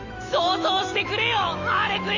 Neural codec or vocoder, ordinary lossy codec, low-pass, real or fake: autoencoder, 48 kHz, 128 numbers a frame, DAC-VAE, trained on Japanese speech; none; 7.2 kHz; fake